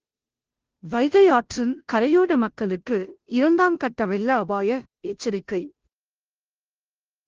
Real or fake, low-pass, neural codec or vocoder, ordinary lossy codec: fake; 7.2 kHz; codec, 16 kHz, 0.5 kbps, FunCodec, trained on Chinese and English, 25 frames a second; Opus, 16 kbps